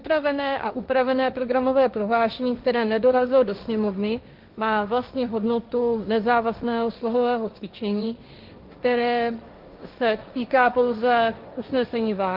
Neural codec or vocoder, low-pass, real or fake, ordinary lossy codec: codec, 16 kHz, 1.1 kbps, Voila-Tokenizer; 5.4 kHz; fake; Opus, 32 kbps